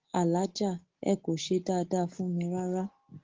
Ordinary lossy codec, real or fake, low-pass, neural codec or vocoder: Opus, 16 kbps; real; 7.2 kHz; none